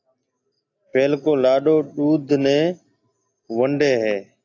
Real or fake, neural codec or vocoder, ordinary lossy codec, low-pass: real; none; AAC, 48 kbps; 7.2 kHz